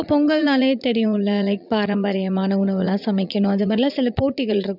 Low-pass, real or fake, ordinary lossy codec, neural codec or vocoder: 5.4 kHz; fake; none; vocoder, 44.1 kHz, 80 mel bands, Vocos